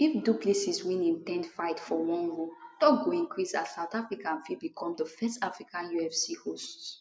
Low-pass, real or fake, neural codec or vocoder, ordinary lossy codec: none; real; none; none